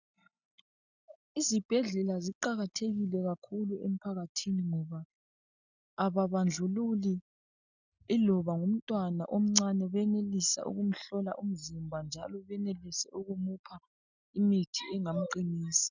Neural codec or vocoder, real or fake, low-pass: none; real; 7.2 kHz